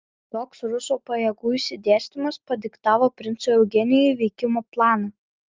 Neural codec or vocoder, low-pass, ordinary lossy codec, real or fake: none; 7.2 kHz; Opus, 24 kbps; real